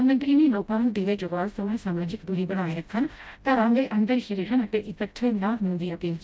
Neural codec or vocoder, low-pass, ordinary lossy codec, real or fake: codec, 16 kHz, 0.5 kbps, FreqCodec, smaller model; none; none; fake